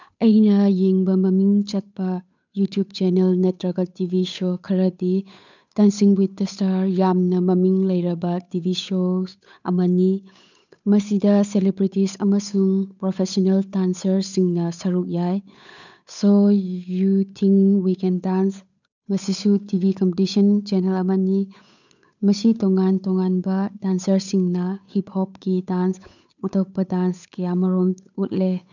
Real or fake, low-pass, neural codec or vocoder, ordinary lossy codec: fake; 7.2 kHz; codec, 16 kHz, 8 kbps, FunCodec, trained on Chinese and English, 25 frames a second; none